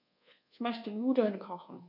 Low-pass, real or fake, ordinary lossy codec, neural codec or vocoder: 5.4 kHz; fake; none; codec, 24 kHz, 1.2 kbps, DualCodec